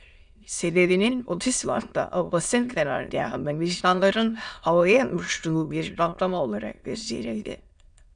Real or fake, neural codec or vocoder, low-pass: fake; autoencoder, 22.05 kHz, a latent of 192 numbers a frame, VITS, trained on many speakers; 9.9 kHz